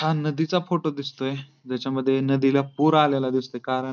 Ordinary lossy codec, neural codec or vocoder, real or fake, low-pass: none; none; real; 7.2 kHz